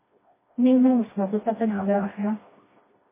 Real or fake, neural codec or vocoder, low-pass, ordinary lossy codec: fake; codec, 16 kHz, 1 kbps, FreqCodec, smaller model; 3.6 kHz; MP3, 16 kbps